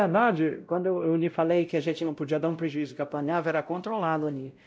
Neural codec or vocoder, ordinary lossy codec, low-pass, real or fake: codec, 16 kHz, 0.5 kbps, X-Codec, WavLM features, trained on Multilingual LibriSpeech; none; none; fake